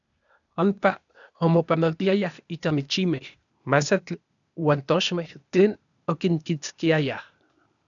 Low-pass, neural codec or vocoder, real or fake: 7.2 kHz; codec, 16 kHz, 0.8 kbps, ZipCodec; fake